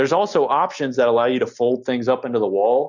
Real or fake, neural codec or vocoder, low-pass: real; none; 7.2 kHz